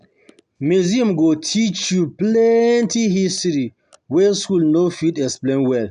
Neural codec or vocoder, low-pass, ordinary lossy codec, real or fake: none; 10.8 kHz; none; real